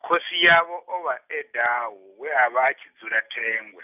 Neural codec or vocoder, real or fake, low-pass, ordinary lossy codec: none; real; 3.6 kHz; none